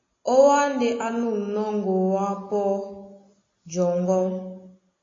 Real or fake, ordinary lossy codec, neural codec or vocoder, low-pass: real; AAC, 32 kbps; none; 7.2 kHz